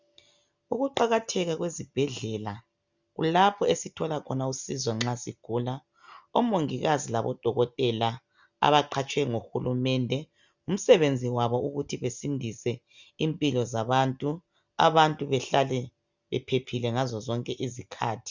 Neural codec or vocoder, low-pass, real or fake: none; 7.2 kHz; real